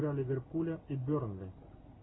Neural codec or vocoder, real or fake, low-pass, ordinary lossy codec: none; real; 7.2 kHz; AAC, 16 kbps